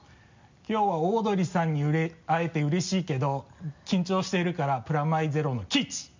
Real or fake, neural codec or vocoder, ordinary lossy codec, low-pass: real; none; MP3, 64 kbps; 7.2 kHz